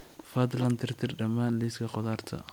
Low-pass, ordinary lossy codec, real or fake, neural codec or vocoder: 19.8 kHz; MP3, 96 kbps; real; none